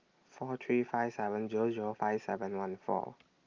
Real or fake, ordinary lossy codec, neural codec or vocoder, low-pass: real; Opus, 32 kbps; none; 7.2 kHz